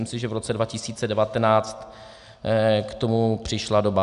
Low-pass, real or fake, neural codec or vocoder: 10.8 kHz; real; none